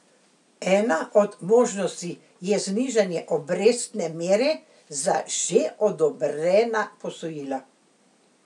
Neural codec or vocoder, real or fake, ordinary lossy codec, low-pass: none; real; none; 10.8 kHz